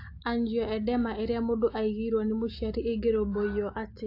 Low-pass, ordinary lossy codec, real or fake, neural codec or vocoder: 5.4 kHz; AAC, 32 kbps; real; none